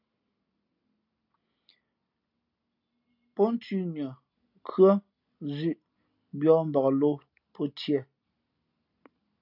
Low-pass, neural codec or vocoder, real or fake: 5.4 kHz; none; real